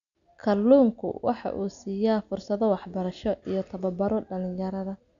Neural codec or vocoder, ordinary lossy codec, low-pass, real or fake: none; none; 7.2 kHz; real